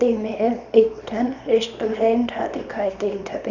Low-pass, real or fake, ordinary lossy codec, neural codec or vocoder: 7.2 kHz; fake; none; codec, 24 kHz, 0.9 kbps, WavTokenizer, small release